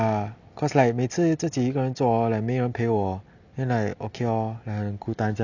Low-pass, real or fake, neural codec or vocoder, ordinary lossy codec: 7.2 kHz; real; none; none